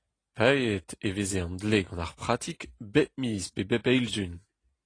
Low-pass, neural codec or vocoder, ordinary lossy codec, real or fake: 9.9 kHz; none; AAC, 32 kbps; real